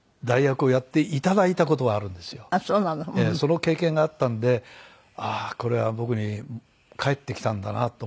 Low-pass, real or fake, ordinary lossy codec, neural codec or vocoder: none; real; none; none